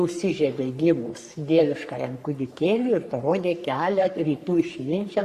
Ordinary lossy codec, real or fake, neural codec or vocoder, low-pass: Opus, 64 kbps; fake; codec, 44.1 kHz, 3.4 kbps, Pupu-Codec; 14.4 kHz